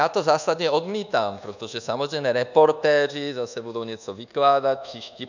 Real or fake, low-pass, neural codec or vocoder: fake; 7.2 kHz; codec, 24 kHz, 1.2 kbps, DualCodec